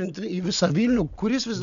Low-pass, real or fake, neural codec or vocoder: 7.2 kHz; real; none